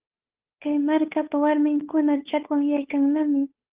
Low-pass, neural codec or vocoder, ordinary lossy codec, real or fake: 3.6 kHz; codec, 16 kHz, 8 kbps, FunCodec, trained on Chinese and English, 25 frames a second; Opus, 32 kbps; fake